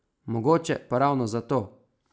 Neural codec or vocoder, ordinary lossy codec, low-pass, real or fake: none; none; none; real